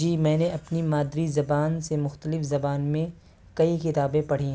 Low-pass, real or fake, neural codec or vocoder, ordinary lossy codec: none; real; none; none